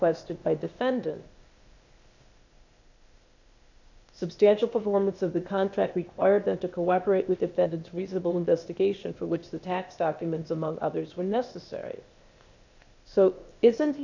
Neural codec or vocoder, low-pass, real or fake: codec, 16 kHz, 0.8 kbps, ZipCodec; 7.2 kHz; fake